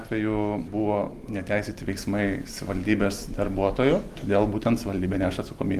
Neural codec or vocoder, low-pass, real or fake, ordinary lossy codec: none; 14.4 kHz; real; Opus, 24 kbps